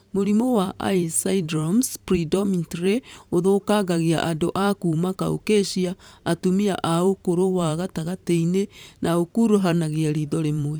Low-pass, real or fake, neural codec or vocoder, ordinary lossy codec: none; fake; vocoder, 44.1 kHz, 128 mel bands every 512 samples, BigVGAN v2; none